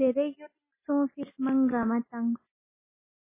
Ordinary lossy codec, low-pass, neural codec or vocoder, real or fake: MP3, 16 kbps; 3.6 kHz; none; real